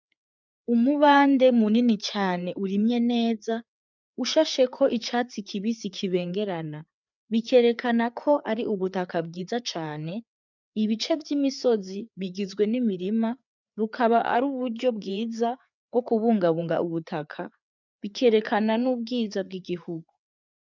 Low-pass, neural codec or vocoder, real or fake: 7.2 kHz; codec, 16 kHz, 4 kbps, FreqCodec, larger model; fake